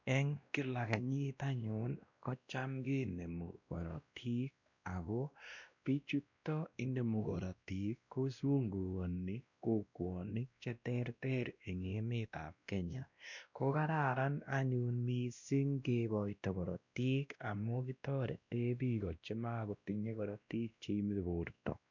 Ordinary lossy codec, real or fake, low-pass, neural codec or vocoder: none; fake; 7.2 kHz; codec, 16 kHz, 1 kbps, X-Codec, WavLM features, trained on Multilingual LibriSpeech